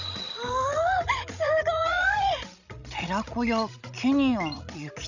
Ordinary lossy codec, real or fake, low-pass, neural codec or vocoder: none; fake; 7.2 kHz; codec, 16 kHz, 16 kbps, FreqCodec, larger model